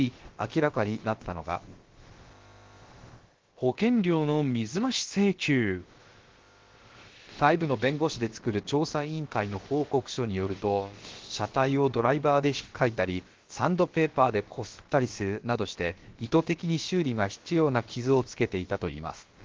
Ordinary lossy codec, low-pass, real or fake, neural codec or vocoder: Opus, 16 kbps; 7.2 kHz; fake; codec, 16 kHz, about 1 kbps, DyCAST, with the encoder's durations